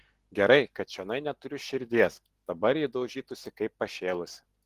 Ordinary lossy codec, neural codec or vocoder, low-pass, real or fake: Opus, 16 kbps; none; 14.4 kHz; real